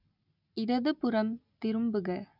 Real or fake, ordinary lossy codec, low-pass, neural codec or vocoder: real; none; 5.4 kHz; none